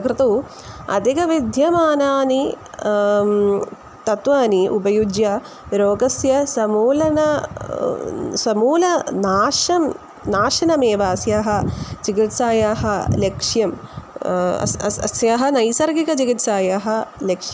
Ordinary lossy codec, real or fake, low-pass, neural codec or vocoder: none; real; none; none